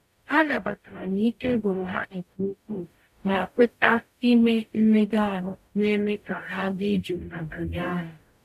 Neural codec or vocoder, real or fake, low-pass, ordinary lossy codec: codec, 44.1 kHz, 0.9 kbps, DAC; fake; 14.4 kHz; none